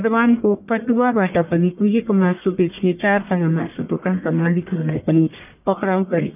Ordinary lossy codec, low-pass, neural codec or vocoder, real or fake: none; 3.6 kHz; codec, 44.1 kHz, 1.7 kbps, Pupu-Codec; fake